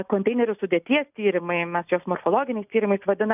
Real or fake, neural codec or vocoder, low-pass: real; none; 3.6 kHz